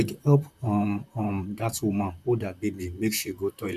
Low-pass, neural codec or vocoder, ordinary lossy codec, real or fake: 14.4 kHz; vocoder, 44.1 kHz, 128 mel bands, Pupu-Vocoder; none; fake